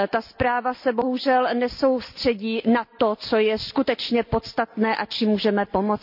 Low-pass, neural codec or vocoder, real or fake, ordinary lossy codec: 5.4 kHz; none; real; none